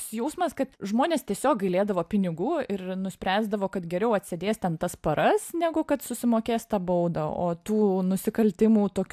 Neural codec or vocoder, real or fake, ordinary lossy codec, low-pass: none; real; AAC, 96 kbps; 14.4 kHz